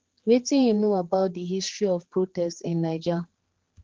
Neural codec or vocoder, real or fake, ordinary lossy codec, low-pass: codec, 16 kHz, 4 kbps, X-Codec, HuBERT features, trained on general audio; fake; Opus, 16 kbps; 7.2 kHz